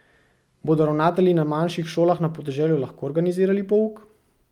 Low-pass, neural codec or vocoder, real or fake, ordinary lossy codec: 19.8 kHz; none; real; Opus, 32 kbps